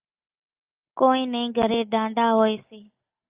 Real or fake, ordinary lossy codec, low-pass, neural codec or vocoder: real; Opus, 24 kbps; 3.6 kHz; none